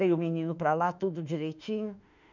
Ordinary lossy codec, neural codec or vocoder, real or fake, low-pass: none; autoencoder, 48 kHz, 32 numbers a frame, DAC-VAE, trained on Japanese speech; fake; 7.2 kHz